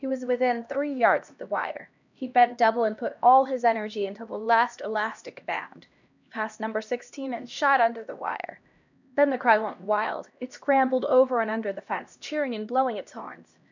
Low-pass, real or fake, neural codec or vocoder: 7.2 kHz; fake; codec, 16 kHz, 1 kbps, X-Codec, HuBERT features, trained on LibriSpeech